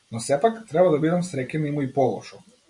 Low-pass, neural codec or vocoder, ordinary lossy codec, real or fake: 10.8 kHz; none; AAC, 64 kbps; real